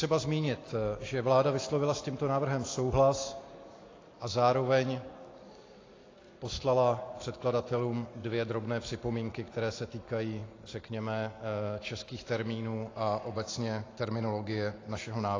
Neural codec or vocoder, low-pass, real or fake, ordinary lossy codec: none; 7.2 kHz; real; AAC, 32 kbps